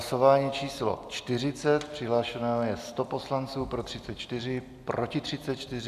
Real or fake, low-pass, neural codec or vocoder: real; 14.4 kHz; none